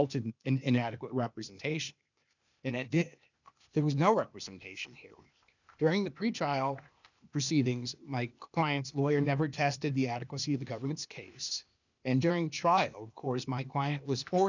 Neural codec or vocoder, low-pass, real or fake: codec, 16 kHz, 0.8 kbps, ZipCodec; 7.2 kHz; fake